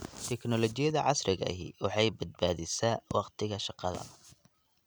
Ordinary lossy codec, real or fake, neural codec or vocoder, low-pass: none; real; none; none